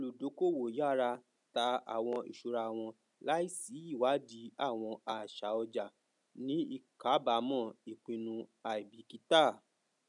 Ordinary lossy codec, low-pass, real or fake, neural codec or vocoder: none; 10.8 kHz; real; none